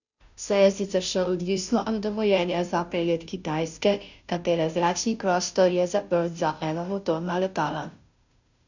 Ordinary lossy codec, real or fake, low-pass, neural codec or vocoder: none; fake; 7.2 kHz; codec, 16 kHz, 0.5 kbps, FunCodec, trained on Chinese and English, 25 frames a second